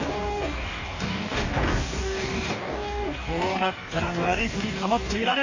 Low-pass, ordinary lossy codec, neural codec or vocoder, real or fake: 7.2 kHz; AAC, 48 kbps; codec, 44.1 kHz, 2.6 kbps, DAC; fake